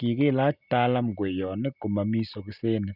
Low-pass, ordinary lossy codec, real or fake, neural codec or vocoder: 5.4 kHz; none; real; none